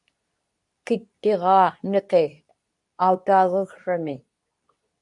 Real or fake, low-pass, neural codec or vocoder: fake; 10.8 kHz; codec, 24 kHz, 0.9 kbps, WavTokenizer, medium speech release version 2